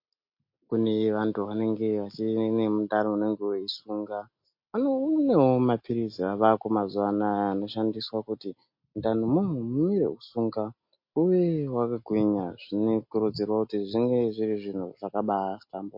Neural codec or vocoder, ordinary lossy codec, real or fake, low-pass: none; MP3, 32 kbps; real; 5.4 kHz